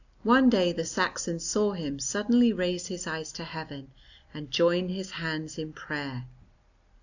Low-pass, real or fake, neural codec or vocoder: 7.2 kHz; real; none